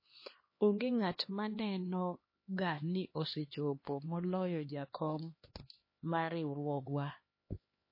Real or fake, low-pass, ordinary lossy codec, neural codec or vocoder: fake; 5.4 kHz; MP3, 24 kbps; codec, 16 kHz, 2 kbps, X-Codec, HuBERT features, trained on LibriSpeech